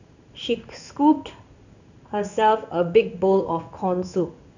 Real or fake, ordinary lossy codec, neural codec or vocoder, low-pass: fake; none; codec, 16 kHz in and 24 kHz out, 1 kbps, XY-Tokenizer; 7.2 kHz